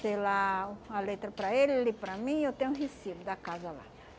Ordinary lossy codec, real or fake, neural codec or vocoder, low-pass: none; real; none; none